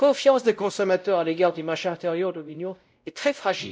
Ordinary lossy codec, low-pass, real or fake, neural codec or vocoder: none; none; fake; codec, 16 kHz, 0.5 kbps, X-Codec, WavLM features, trained on Multilingual LibriSpeech